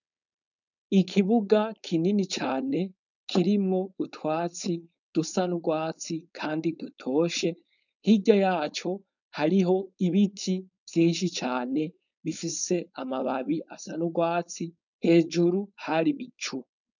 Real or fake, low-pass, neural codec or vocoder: fake; 7.2 kHz; codec, 16 kHz, 4.8 kbps, FACodec